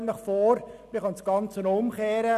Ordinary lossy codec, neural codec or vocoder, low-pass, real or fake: none; none; 14.4 kHz; real